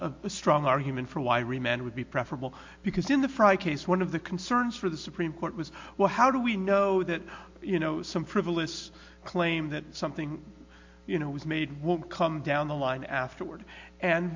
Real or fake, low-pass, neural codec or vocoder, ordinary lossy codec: real; 7.2 kHz; none; MP3, 48 kbps